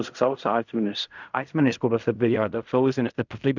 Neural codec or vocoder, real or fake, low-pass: codec, 16 kHz in and 24 kHz out, 0.4 kbps, LongCat-Audio-Codec, fine tuned four codebook decoder; fake; 7.2 kHz